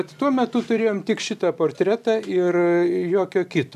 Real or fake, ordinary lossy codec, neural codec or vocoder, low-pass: real; MP3, 96 kbps; none; 14.4 kHz